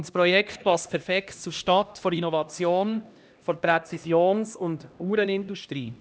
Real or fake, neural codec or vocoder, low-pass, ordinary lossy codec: fake; codec, 16 kHz, 1 kbps, X-Codec, HuBERT features, trained on LibriSpeech; none; none